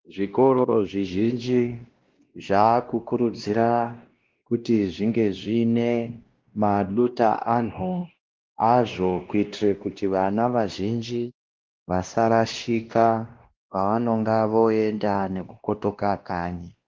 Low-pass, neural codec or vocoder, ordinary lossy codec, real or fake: 7.2 kHz; codec, 16 kHz, 1 kbps, X-Codec, WavLM features, trained on Multilingual LibriSpeech; Opus, 16 kbps; fake